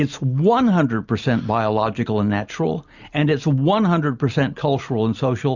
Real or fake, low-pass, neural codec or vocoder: real; 7.2 kHz; none